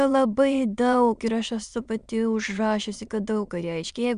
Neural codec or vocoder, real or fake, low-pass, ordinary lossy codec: autoencoder, 22.05 kHz, a latent of 192 numbers a frame, VITS, trained on many speakers; fake; 9.9 kHz; Opus, 64 kbps